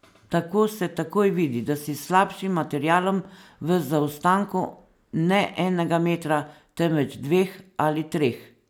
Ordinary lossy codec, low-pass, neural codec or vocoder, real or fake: none; none; none; real